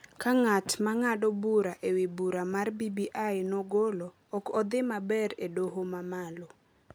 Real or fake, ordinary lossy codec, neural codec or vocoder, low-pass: real; none; none; none